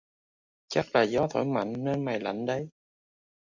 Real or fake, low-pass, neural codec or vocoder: real; 7.2 kHz; none